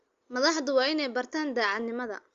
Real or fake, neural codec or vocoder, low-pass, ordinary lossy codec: real; none; 7.2 kHz; Opus, 32 kbps